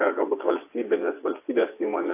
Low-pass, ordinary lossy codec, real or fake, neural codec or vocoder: 3.6 kHz; AAC, 24 kbps; fake; vocoder, 22.05 kHz, 80 mel bands, WaveNeXt